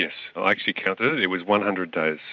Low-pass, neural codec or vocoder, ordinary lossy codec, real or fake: 7.2 kHz; none; MP3, 64 kbps; real